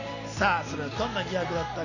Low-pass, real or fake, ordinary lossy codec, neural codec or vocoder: 7.2 kHz; real; none; none